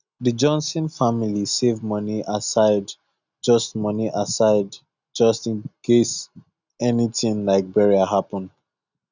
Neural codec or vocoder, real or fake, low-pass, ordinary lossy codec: none; real; 7.2 kHz; none